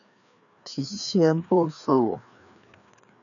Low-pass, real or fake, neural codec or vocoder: 7.2 kHz; fake; codec, 16 kHz, 2 kbps, FreqCodec, larger model